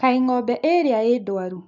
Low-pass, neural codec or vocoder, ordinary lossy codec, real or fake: 7.2 kHz; none; none; real